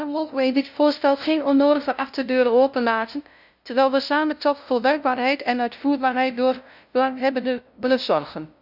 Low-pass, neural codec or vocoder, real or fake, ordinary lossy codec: 5.4 kHz; codec, 16 kHz, 0.5 kbps, FunCodec, trained on LibriTTS, 25 frames a second; fake; none